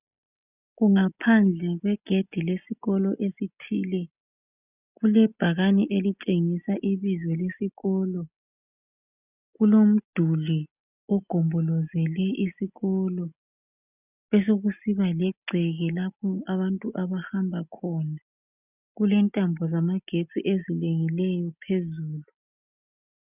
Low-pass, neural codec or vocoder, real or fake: 3.6 kHz; none; real